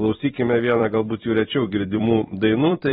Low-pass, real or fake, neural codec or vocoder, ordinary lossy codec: 19.8 kHz; fake; vocoder, 44.1 kHz, 128 mel bands every 256 samples, BigVGAN v2; AAC, 16 kbps